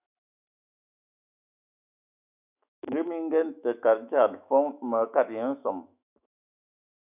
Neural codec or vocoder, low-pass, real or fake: autoencoder, 48 kHz, 128 numbers a frame, DAC-VAE, trained on Japanese speech; 3.6 kHz; fake